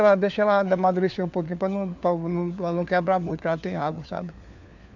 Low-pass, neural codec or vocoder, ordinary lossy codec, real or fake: 7.2 kHz; codec, 16 kHz, 4 kbps, FunCodec, trained on LibriTTS, 50 frames a second; none; fake